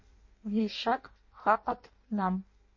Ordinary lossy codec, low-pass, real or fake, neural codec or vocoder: MP3, 32 kbps; 7.2 kHz; fake; codec, 16 kHz in and 24 kHz out, 0.6 kbps, FireRedTTS-2 codec